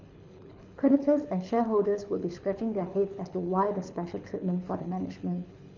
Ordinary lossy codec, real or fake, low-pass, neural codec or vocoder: none; fake; 7.2 kHz; codec, 24 kHz, 6 kbps, HILCodec